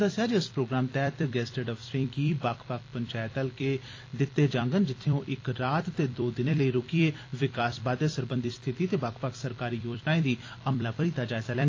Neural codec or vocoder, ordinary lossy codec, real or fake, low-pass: vocoder, 44.1 kHz, 128 mel bands every 256 samples, BigVGAN v2; AAC, 32 kbps; fake; 7.2 kHz